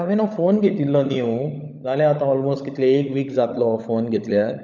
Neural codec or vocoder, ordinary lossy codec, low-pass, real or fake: codec, 16 kHz, 16 kbps, FunCodec, trained on LibriTTS, 50 frames a second; none; 7.2 kHz; fake